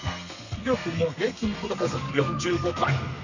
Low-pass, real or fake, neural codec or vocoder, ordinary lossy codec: 7.2 kHz; fake; codec, 32 kHz, 1.9 kbps, SNAC; none